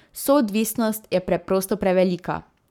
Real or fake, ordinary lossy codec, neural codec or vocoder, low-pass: fake; none; codec, 44.1 kHz, 7.8 kbps, Pupu-Codec; 19.8 kHz